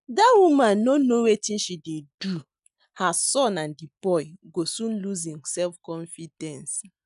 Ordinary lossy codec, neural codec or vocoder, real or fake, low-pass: none; none; real; 10.8 kHz